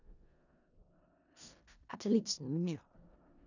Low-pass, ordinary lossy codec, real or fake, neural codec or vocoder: 7.2 kHz; none; fake; codec, 16 kHz in and 24 kHz out, 0.4 kbps, LongCat-Audio-Codec, four codebook decoder